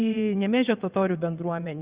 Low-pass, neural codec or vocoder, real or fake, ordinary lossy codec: 3.6 kHz; vocoder, 22.05 kHz, 80 mel bands, WaveNeXt; fake; Opus, 64 kbps